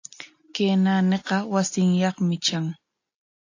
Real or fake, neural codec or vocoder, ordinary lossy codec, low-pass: real; none; AAC, 48 kbps; 7.2 kHz